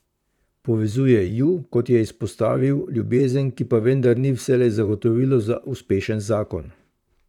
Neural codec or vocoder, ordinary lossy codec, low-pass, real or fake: vocoder, 44.1 kHz, 128 mel bands, Pupu-Vocoder; none; 19.8 kHz; fake